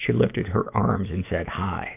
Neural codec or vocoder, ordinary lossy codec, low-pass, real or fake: autoencoder, 48 kHz, 128 numbers a frame, DAC-VAE, trained on Japanese speech; AAC, 24 kbps; 3.6 kHz; fake